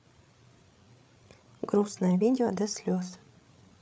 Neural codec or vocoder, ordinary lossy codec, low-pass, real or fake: codec, 16 kHz, 8 kbps, FreqCodec, larger model; none; none; fake